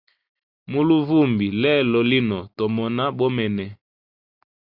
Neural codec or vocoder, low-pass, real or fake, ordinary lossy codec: none; 5.4 kHz; real; Opus, 64 kbps